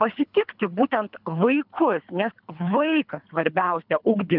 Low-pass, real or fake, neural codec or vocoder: 5.4 kHz; fake; codec, 24 kHz, 3 kbps, HILCodec